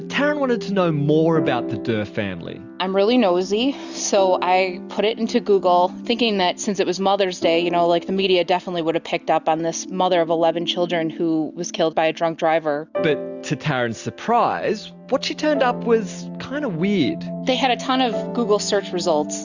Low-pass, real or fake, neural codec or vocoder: 7.2 kHz; real; none